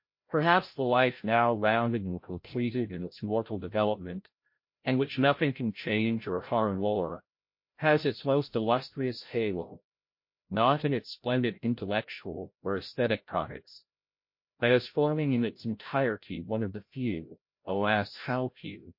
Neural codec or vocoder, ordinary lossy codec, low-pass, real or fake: codec, 16 kHz, 0.5 kbps, FreqCodec, larger model; MP3, 32 kbps; 5.4 kHz; fake